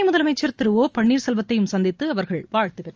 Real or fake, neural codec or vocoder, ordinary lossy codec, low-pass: real; none; Opus, 24 kbps; 7.2 kHz